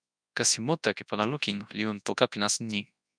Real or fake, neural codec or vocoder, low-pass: fake; codec, 24 kHz, 0.9 kbps, WavTokenizer, large speech release; 9.9 kHz